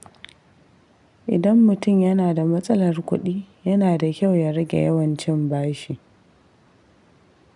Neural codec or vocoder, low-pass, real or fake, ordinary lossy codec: none; 10.8 kHz; real; none